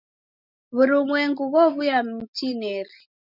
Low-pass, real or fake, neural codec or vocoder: 5.4 kHz; real; none